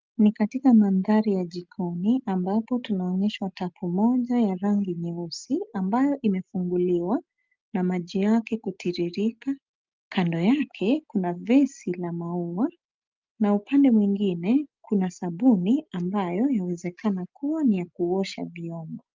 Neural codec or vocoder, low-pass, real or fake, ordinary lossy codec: none; 7.2 kHz; real; Opus, 24 kbps